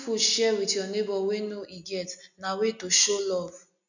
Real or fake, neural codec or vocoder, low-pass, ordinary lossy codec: real; none; 7.2 kHz; none